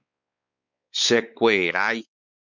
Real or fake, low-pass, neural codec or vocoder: fake; 7.2 kHz; codec, 16 kHz, 4 kbps, X-Codec, WavLM features, trained on Multilingual LibriSpeech